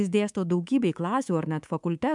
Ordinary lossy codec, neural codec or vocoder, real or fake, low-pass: MP3, 96 kbps; codec, 24 kHz, 3.1 kbps, DualCodec; fake; 10.8 kHz